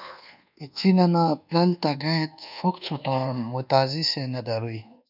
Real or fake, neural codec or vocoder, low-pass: fake; codec, 24 kHz, 1.2 kbps, DualCodec; 5.4 kHz